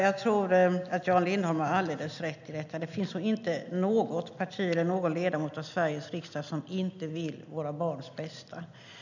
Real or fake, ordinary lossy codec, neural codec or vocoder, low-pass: real; none; none; 7.2 kHz